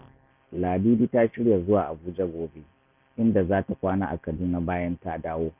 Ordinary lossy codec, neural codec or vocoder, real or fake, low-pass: none; none; real; 3.6 kHz